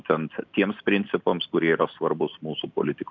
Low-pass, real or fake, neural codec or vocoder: 7.2 kHz; real; none